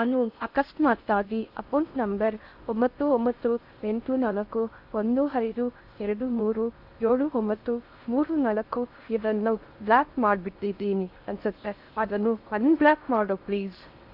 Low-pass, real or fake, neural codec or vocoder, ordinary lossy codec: 5.4 kHz; fake; codec, 16 kHz in and 24 kHz out, 0.6 kbps, FocalCodec, streaming, 4096 codes; Opus, 64 kbps